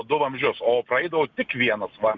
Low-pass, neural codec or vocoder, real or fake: 7.2 kHz; none; real